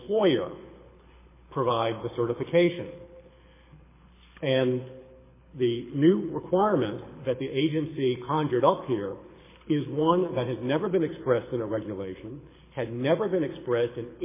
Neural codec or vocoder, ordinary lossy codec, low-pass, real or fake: codec, 24 kHz, 6 kbps, HILCodec; MP3, 16 kbps; 3.6 kHz; fake